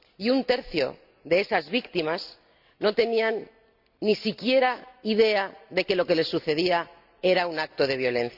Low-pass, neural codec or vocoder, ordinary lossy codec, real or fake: 5.4 kHz; none; Opus, 64 kbps; real